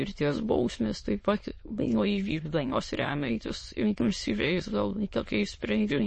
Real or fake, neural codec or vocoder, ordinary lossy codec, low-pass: fake; autoencoder, 22.05 kHz, a latent of 192 numbers a frame, VITS, trained on many speakers; MP3, 32 kbps; 9.9 kHz